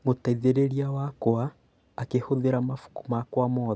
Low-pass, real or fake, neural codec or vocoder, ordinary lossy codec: none; real; none; none